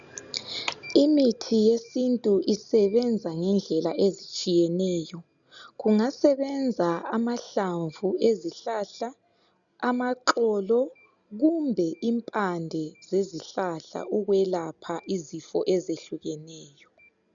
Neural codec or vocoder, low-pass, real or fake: none; 7.2 kHz; real